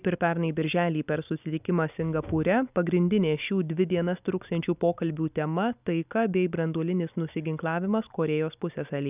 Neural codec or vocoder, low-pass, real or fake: none; 3.6 kHz; real